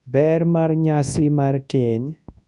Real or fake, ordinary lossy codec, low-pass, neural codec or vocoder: fake; none; 10.8 kHz; codec, 24 kHz, 0.9 kbps, WavTokenizer, large speech release